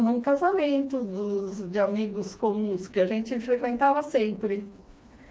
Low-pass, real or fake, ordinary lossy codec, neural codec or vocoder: none; fake; none; codec, 16 kHz, 2 kbps, FreqCodec, smaller model